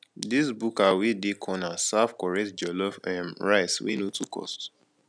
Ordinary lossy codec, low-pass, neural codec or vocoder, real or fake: none; 9.9 kHz; none; real